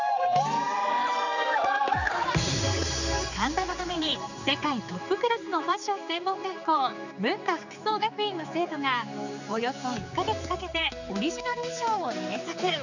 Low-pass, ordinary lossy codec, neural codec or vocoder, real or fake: 7.2 kHz; none; codec, 16 kHz, 4 kbps, X-Codec, HuBERT features, trained on general audio; fake